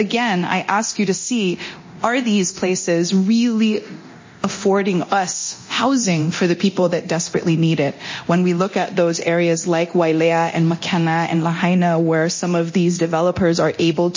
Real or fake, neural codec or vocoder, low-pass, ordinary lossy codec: fake; codec, 24 kHz, 0.9 kbps, DualCodec; 7.2 kHz; MP3, 32 kbps